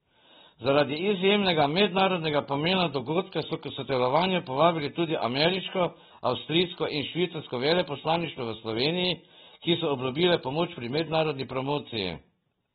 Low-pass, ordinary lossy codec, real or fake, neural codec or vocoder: 19.8 kHz; AAC, 16 kbps; real; none